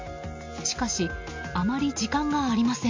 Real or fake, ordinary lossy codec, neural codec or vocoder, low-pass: real; MP3, 48 kbps; none; 7.2 kHz